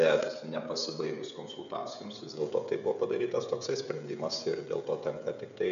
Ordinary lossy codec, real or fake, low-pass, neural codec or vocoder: MP3, 96 kbps; fake; 7.2 kHz; codec, 16 kHz, 16 kbps, FreqCodec, smaller model